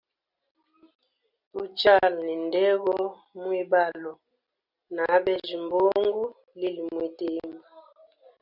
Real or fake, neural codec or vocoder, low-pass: real; none; 5.4 kHz